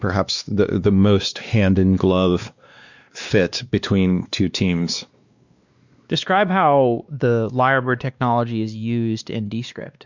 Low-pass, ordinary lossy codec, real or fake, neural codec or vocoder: 7.2 kHz; Opus, 64 kbps; fake; codec, 16 kHz, 2 kbps, X-Codec, WavLM features, trained on Multilingual LibriSpeech